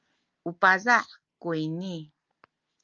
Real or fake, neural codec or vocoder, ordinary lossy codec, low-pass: real; none; Opus, 24 kbps; 7.2 kHz